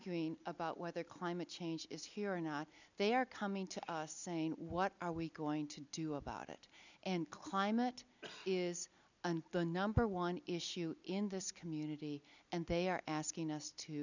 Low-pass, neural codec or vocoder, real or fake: 7.2 kHz; none; real